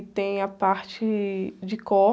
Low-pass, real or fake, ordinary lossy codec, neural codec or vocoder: none; real; none; none